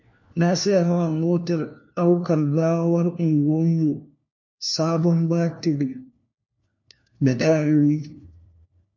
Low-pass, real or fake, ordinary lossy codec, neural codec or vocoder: 7.2 kHz; fake; MP3, 48 kbps; codec, 16 kHz, 1 kbps, FunCodec, trained on LibriTTS, 50 frames a second